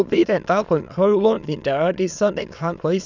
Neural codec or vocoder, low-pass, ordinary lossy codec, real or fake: autoencoder, 22.05 kHz, a latent of 192 numbers a frame, VITS, trained on many speakers; 7.2 kHz; none; fake